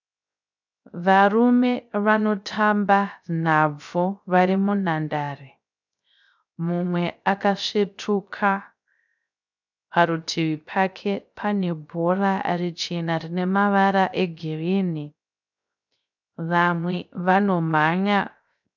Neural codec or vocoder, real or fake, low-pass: codec, 16 kHz, 0.3 kbps, FocalCodec; fake; 7.2 kHz